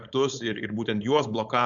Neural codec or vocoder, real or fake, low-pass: codec, 16 kHz, 4.8 kbps, FACodec; fake; 7.2 kHz